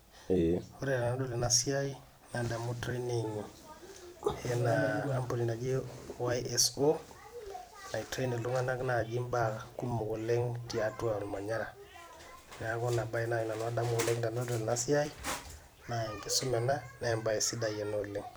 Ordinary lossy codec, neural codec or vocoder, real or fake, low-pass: none; vocoder, 44.1 kHz, 128 mel bands every 256 samples, BigVGAN v2; fake; none